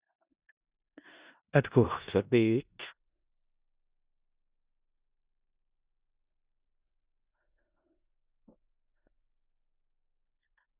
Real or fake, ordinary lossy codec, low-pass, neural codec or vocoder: fake; Opus, 64 kbps; 3.6 kHz; codec, 16 kHz in and 24 kHz out, 0.4 kbps, LongCat-Audio-Codec, four codebook decoder